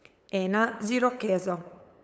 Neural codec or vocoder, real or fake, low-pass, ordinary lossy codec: codec, 16 kHz, 8 kbps, FunCodec, trained on LibriTTS, 25 frames a second; fake; none; none